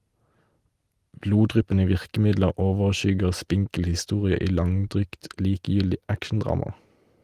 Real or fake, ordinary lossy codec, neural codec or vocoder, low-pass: real; Opus, 24 kbps; none; 14.4 kHz